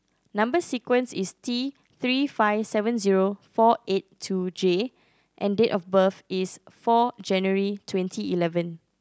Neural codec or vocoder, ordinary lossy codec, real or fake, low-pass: none; none; real; none